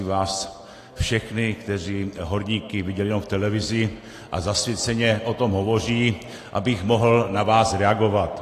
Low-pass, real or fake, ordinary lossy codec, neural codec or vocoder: 14.4 kHz; real; AAC, 48 kbps; none